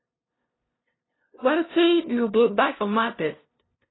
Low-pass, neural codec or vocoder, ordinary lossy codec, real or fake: 7.2 kHz; codec, 16 kHz, 0.5 kbps, FunCodec, trained on LibriTTS, 25 frames a second; AAC, 16 kbps; fake